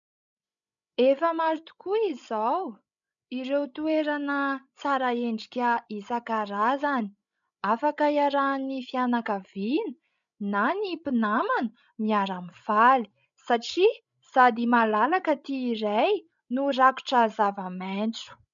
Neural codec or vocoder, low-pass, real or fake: codec, 16 kHz, 16 kbps, FreqCodec, larger model; 7.2 kHz; fake